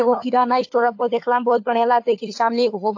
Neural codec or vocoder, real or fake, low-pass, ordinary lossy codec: codec, 16 kHz, 4 kbps, FunCodec, trained on LibriTTS, 50 frames a second; fake; 7.2 kHz; AAC, 48 kbps